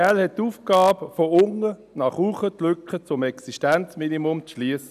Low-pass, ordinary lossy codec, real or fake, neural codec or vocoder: 14.4 kHz; none; real; none